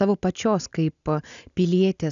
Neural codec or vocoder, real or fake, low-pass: none; real; 7.2 kHz